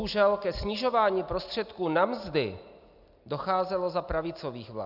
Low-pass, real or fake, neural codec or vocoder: 5.4 kHz; real; none